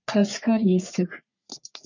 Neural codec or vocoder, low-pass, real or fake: codec, 44.1 kHz, 3.4 kbps, Pupu-Codec; 7.2 kHz; fake